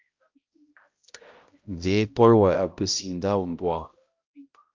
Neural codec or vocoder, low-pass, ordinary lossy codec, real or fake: codec, 16 kHz, 0.5 kbps, X-Codec, HuBERT features, trained on balanced general audio; 7.2 kHz; Opus, 24 kbps; fake